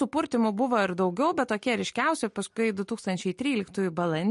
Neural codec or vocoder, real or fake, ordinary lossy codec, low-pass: none; real; MP3, 48 kbps; 14.4 kHz